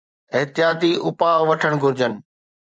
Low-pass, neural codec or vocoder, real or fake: 9.9 kHz; vocoder, 24 kHz, 100 mel bands, Vocos; fake